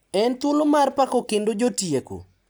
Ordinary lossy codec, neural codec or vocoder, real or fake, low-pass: none; none; real; none